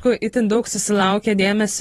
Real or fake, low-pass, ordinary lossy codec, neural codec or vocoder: fake; 19.8 kHz; AAC, 32 kbps; vocoder, 44.1 kHz, 128 mel bands every 512 samples, BigVGAN v2